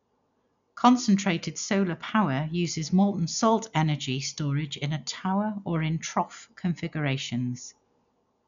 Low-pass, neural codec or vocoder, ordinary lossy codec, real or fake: 7.2 kHz; none; none; real